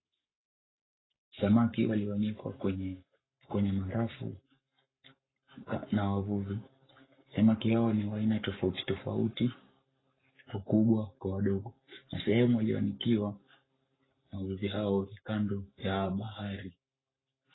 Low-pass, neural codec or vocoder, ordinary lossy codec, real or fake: 7.2 kHz; codec, 44.1 kHz, 7.8 kbps, Pupu-Codec; AAC, 16 kbps; fake